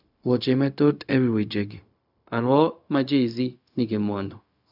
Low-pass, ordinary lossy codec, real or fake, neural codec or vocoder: 5.4 kHz; none; fake; codec, 16 kHz, 0.4 kbps, LongCat-Audio-Codec